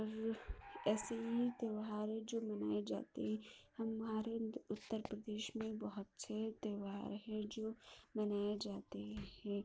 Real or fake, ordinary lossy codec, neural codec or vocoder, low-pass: real; none; none; none